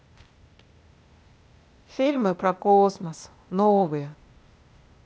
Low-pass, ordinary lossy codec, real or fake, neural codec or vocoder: none; none; fake; codec, 16 kHz, 0.8 kbps, ZipCodec